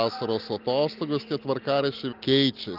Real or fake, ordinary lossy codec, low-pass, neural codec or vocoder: real; Opus, 32 kbps; 5.4 kHz; none